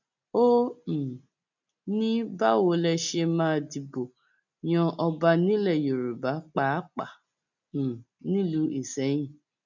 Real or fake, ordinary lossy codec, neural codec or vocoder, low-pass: real; none; none; 7.2 kHz